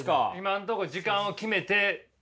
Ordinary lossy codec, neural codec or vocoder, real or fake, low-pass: none; none; real; none